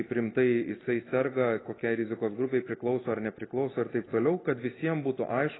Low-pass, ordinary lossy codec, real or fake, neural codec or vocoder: 7.2 kHz; AAC, 16 kbps; real; none